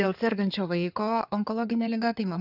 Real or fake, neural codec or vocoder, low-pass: fake; codec, 16 kHz in and 24 kHz out, 2.2 kbps, FireRedTTS-2 codec; 5.4 kHz